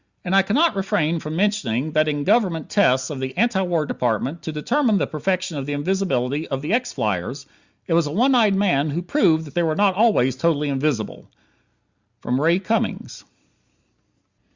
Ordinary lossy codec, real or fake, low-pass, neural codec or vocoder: Opus, 64 kbps; real; 7.2 kHz; none